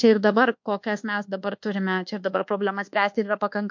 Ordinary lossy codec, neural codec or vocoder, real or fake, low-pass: MP3, 48 kbps; codec, 24 kHz, 1.2 kbps, DualCodec; fake; 7.2 kHz